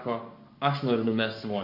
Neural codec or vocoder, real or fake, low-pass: codec, 44.1 kHz, 7.8 kbps, Pupu-Codec; fake; 5.4 kHz